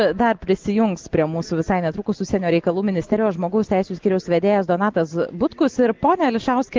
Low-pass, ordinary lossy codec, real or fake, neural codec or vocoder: 7.2 kHz; Opus, 16 kbps; real; none